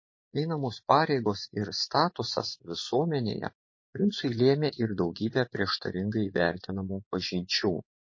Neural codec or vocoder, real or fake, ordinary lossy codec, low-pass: vocoder, 24 kHz, 100 mel bands, Vocos; fake; MP3, 32 kbps; 7.2 kHz